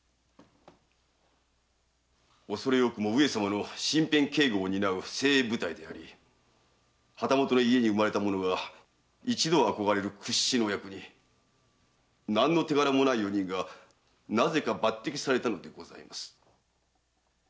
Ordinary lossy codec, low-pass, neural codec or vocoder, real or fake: none; none; none; real